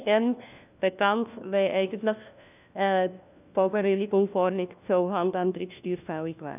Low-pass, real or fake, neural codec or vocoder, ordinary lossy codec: 3.6 kHz; fake; codec, 16 kHz, 1 kbps, FunCodec, trained on LibriTTS, 50 frames a second; none